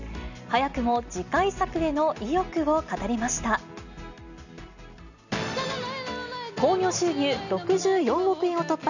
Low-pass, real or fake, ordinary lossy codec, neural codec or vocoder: 7.2 kHz; real; none; none